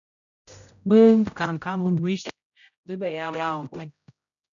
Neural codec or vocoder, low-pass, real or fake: codec, 16 kHz, 0.5 kbps, X-Codec, HuBERT features, trained on general audio; 7.2 kHz; fake